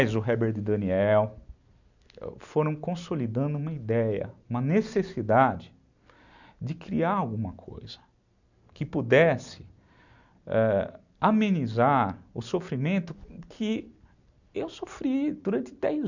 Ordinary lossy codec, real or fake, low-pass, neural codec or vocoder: none; real; 7.2 kHz; none